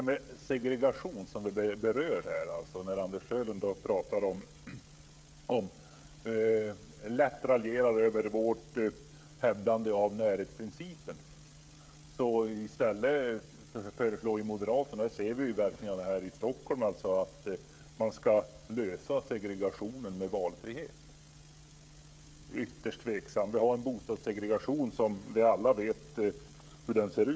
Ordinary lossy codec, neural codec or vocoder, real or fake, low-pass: none; codec, 16 kHz, 16 kbps, FreqCodec, smaller model; fake; none